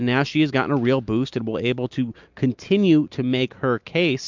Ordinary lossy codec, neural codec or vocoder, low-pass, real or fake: MP3, 64 kbps; none; 7.2 kHz; real